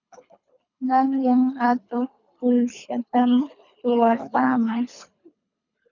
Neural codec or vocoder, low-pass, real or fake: codec, 24 kHz, 3 kbps, HILCodec; 7.2 kHz; fake